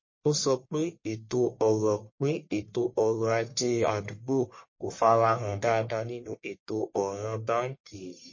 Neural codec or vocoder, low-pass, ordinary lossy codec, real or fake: codec, 44.1 kHz, 1.7 kbps, Pupu-Codec; 7.2 kHz; MP3, 32 kbps; fake